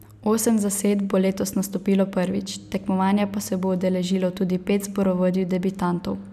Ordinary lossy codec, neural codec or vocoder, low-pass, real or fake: none; none; 14.4 kHz; real